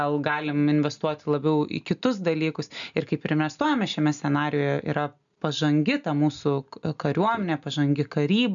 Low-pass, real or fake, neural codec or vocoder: 7.2 kHz; real; none